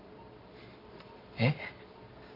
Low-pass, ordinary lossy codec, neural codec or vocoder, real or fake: 5.4 kHz; Opus, 64 kbps; none; real